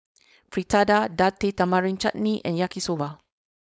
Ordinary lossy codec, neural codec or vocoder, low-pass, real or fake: none; codec, 16 kHz, 4.8 kbps, FACodec; none; fake